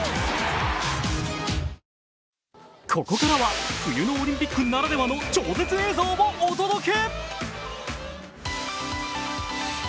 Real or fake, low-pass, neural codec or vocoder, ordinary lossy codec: real; none; none; none